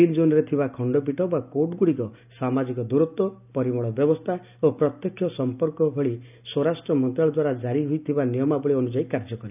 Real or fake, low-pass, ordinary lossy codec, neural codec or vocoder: real; 3.6 kHz; none; none